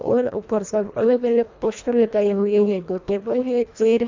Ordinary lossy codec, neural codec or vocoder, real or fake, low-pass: MP3, 64 kbps; codec, 24 kHz, 1.5 kbps, HILCodec; fake; 7.2 kHz